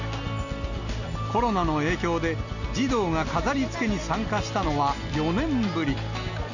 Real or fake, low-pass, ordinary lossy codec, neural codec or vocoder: real; 7.2 kHz; none; none